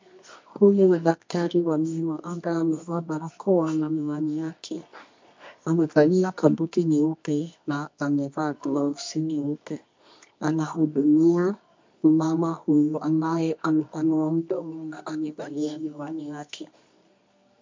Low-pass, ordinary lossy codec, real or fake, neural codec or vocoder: 7.2 kHz; MP3, 48 kbps; fake; codec, 24 kHz, 0.9 kbps, WavTokenizer, medium music audio release